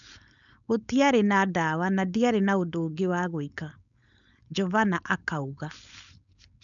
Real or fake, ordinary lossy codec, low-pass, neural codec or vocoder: fake; none; 7.2 kHz; codec, 16 kHz, 4.8 kbps, FACodec